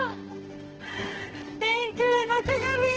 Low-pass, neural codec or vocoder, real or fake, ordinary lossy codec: 7.2 kHz; codec, 24 kHz, 0.9 kbps, WavTokenizer, medium music audio release; fake; Opus, 16 kbps